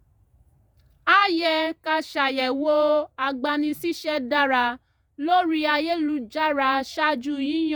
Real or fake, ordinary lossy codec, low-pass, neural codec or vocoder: fake; none; none; vocoder, 48 kHz, 128 mel bands, Vocos